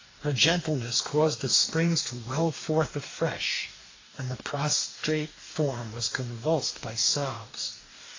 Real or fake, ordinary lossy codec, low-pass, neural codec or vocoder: fake; AAC, 32 kbps; 7.2 kHz; codec, 44.1 kHz, 2.6 kbps, DAC